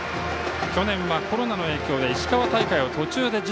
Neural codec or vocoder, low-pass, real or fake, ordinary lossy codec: none; none; real; none